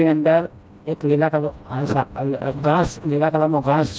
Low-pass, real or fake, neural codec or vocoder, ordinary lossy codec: none; fake; codec, 16 kHz, 1 kbps, FreqCodec, smaller model; none